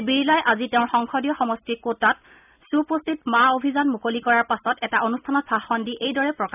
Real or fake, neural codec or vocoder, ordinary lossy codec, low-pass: real; none; none; 3.6 kHz